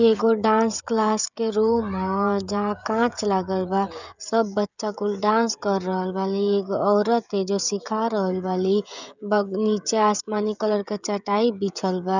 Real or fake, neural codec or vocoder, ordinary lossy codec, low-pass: fake; codec, 16 kHz, 16 kbps, FreqCodec, smaller model; none; 7.2 kHz